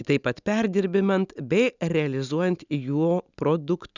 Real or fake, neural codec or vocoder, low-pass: real; none; 7.2 kHz